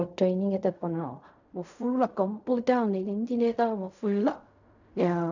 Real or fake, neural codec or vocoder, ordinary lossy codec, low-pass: fake; codec, 16 kHz in and 24 kHz out, 0.4 kbps, LongCat-Audio-Codec, fine tuned four codebook decoder; none; 7.2 kHz